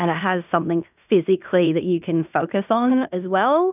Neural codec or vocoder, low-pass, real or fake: codec, 16 kHz in and 24 kHz out, 0.9 kbps, LongCat-Audio-Codec, fine tuned four codebook decoder; 3.6 kHz; fake